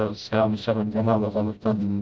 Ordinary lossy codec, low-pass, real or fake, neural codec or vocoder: none; none; fake; codec, 16 kHz, 0.5 kbps, FreqCodec, smaller model